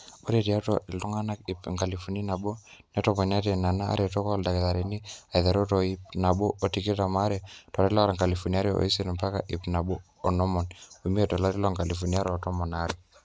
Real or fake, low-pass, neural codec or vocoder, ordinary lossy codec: real; none; none; none